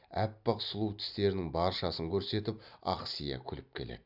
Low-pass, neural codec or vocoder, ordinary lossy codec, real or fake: 5.4 kHz; none; none; real